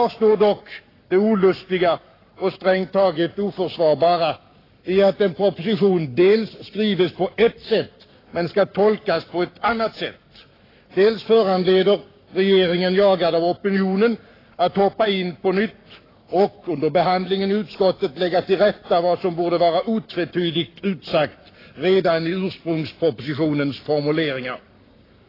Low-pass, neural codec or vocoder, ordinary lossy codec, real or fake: 5.4 kHz; codec, 44.1 kHz, 7.8 kbps, Pupu-Codec; AAC, 24 kbps; fake